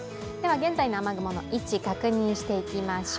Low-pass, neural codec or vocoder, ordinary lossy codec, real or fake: none; none; none; real